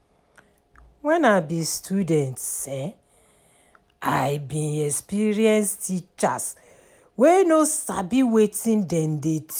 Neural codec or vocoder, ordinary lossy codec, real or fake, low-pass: none; none; real; none